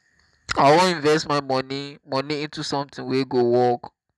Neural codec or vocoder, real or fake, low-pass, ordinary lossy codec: none; real; none; none